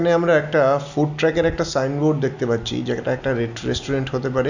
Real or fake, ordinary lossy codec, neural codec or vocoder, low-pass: real; none; none; 7.2 kHz